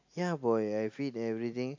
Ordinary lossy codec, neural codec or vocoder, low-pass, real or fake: AAC, 48 kbps; none; 7.2 kHz; real